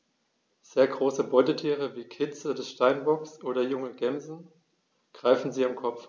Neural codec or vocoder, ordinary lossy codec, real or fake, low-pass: none; none; real; none